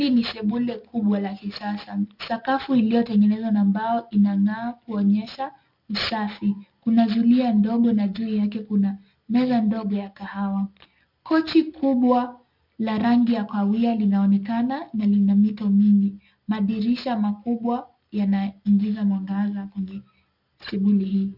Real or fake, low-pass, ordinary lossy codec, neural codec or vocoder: real; 5.4 kHz; MP3, 48 kbps; none